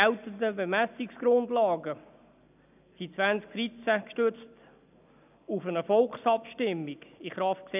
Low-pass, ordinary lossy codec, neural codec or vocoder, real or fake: 3.6 kHz; none; none; real